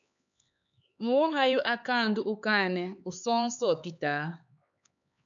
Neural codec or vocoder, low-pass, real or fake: codec, 16 kHz, 4 kbps, X-Codec, HuBERT features, trained on LibriSpeech; 7.2 kHz; fake